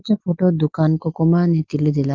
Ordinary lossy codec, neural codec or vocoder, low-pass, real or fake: Opus, 32 kbps; none; 7.2 kHz; real